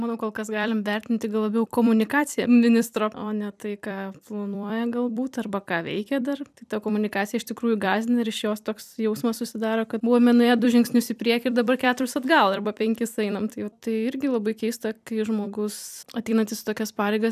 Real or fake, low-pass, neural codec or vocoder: fake; 14.4 kHz; vocoder, 44.1 kHz, 128 mel bands every 256 samples, BigVGAN v2